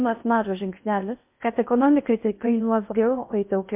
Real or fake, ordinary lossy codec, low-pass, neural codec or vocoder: fake; MP3, 32 kbps; 3.6 kHz; codec, 16 kHz in and 24 kHz out, 0.6 kbps, FocalCodec, streaming, 4096 codes